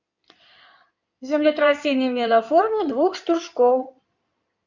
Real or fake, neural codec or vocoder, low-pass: fake; codec, 16 kHz in and 24 kHz out, 2.2 kbps, FireRedTTS-2 codec; 7.2 kHz